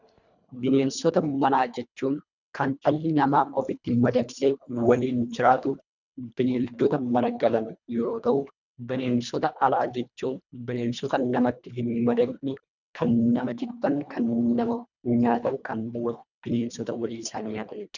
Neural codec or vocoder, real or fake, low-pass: codec, 24 kHz, 1.5 kbps, HILCodec; fake; 7.2 kHz